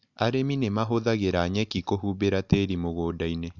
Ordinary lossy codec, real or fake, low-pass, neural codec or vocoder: none; real; 7.2 kHz; none